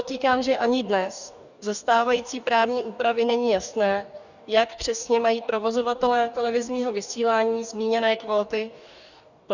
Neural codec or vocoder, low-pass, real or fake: codec, 44.1 kHz, 2.6 kbps, DAC; 7.2 kHz; fake